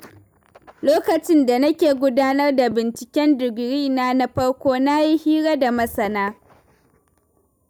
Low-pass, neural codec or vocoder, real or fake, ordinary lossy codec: none; none; real; none